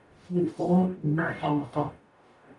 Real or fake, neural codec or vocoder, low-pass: fake; codec, 44.1 kHz, 0.9 kbps, DAC; 10.8 kHz